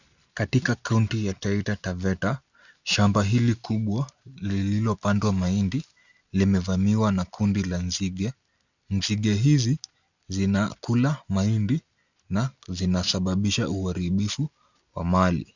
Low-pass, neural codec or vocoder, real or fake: 7.2 kHz; none; real